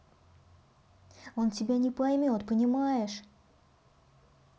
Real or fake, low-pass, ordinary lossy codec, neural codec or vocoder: real; none; none; none